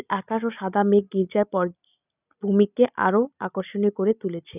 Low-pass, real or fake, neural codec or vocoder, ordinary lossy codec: 3.6 kHz; real; none; none